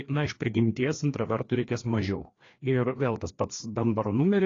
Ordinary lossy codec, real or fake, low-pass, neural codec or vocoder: AAC, 32 kbps; fake; 7.2 kHz; codec, 16 kHz, 2 kbps, FreqCodec, larger model